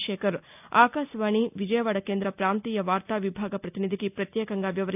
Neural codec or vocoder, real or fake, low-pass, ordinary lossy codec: none; real; 3.6 kHz; none